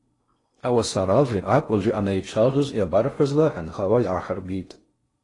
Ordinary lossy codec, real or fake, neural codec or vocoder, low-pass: AAC, 32 kbps; fake; codec, 16 kHz in and 24 kHz out, 0.6 kbps, FocalCodec, streaming, 4096 codes; 10.8 kHz